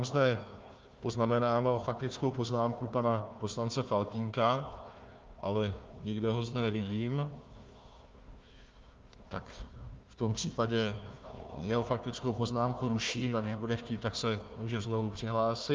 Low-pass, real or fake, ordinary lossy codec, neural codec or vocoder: 7.2 kHz; fake; Opus, 32 kbps; codec, 16 kHz, 1 kbps, FunCodec, trained on Chinese and English, 50 frames a second